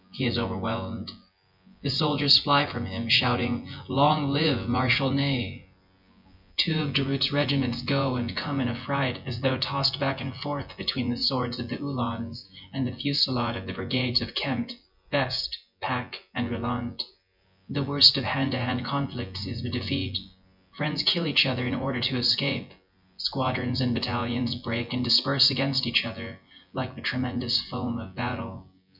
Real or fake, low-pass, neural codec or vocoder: fake; 5.4 kHz; vocoder, 24 kHz, 100 mel bands, Vocos